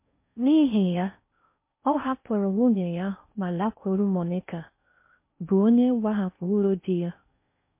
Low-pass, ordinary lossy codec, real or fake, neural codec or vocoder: 3.6 kHz; MP3, 24 kbps; fake; codec, 16 kHz in and 24 kHz out, 0.6 kbps, FocalCodec, streaming, 4096 codes